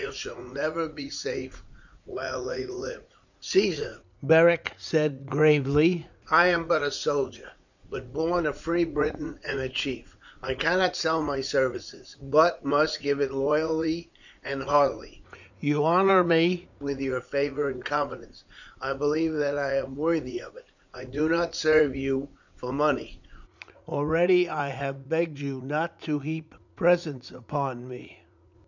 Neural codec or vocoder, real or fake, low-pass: vocoder, 44.1 kHz, 80 mel bands, Vocos; fake; 7.2 kHz